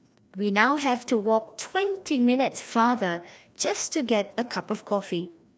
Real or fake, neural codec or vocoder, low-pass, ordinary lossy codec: fake; codec, 16 kHz, 1 kbps, FreqCodec, larger model; none; none